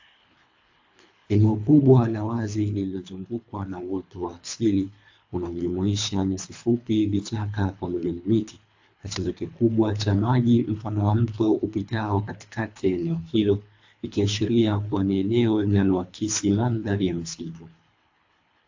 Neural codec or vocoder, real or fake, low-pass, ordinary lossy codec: codec, 24 kHz, 3 kbps, HILCodec; fake; 7.2 kHz; AAC, 48 kbps